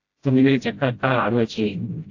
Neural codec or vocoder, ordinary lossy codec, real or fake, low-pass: codec, 16 kHz, 0.5 kbps, FreqCodec, smaller model; AAC, 48 kbps; fake; 7.2 kHz